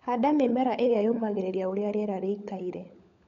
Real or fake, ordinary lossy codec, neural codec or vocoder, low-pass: fake; MP3, 48 kbps; codec, 16 kHz, 16 kbps, FunCodec, trained on LibriTTS, 50 frames a second; 7.2 kHz